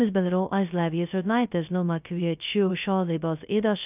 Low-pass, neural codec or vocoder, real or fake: 3.6 kHz; codec, 16 kHz, 0.2 kbps, FocalCodec; fake